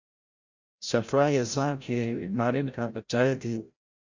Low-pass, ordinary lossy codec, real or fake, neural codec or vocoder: 7.2 kHz; Opus, 64 kbps; fake; codec, 16 kHz, 0.5 kbps, FreqCodec, larger model